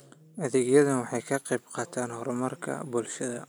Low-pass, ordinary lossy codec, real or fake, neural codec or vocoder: none; none; real; none